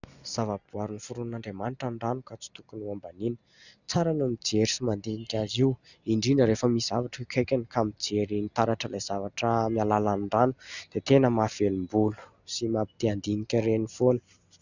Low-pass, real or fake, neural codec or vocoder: 7.2 kHz; real; none